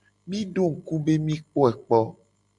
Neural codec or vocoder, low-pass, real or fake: none; 10.8 kHz; real